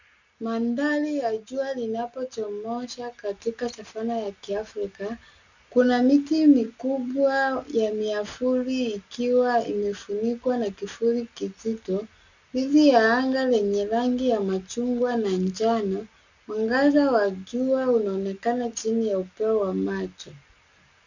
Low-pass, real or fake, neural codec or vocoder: 7.2 kHz; real; none